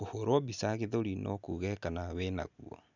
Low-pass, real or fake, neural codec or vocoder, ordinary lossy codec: 7.2 kHz; real; none; none